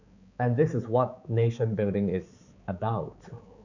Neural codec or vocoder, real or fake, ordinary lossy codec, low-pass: codec, 16 kHz, 4 kbps, X-Codec, HuBERT features, trained on balanced general audio; fake; none; 7.2 kHz